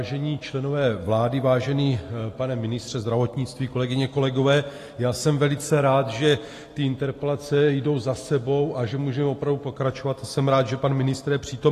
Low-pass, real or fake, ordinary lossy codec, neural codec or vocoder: 14.4 kHz; real; AAC, 48 kbps; none